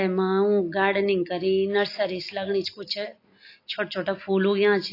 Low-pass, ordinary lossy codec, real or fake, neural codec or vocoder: 5.4 kHz; AAC, 32 kbps; real; none